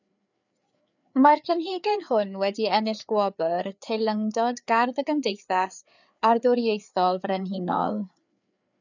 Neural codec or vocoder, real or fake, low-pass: codec, 16 kHz, 4 kbps, FreqCodec, larger model; fake; 7.2 kHz